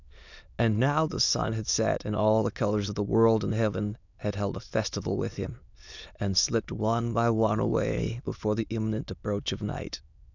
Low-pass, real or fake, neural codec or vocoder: 7.2 kHz; fake; autoencoder, 22.05 kHz, a latent of 192 numbers a frame, VITS, trained on many speakers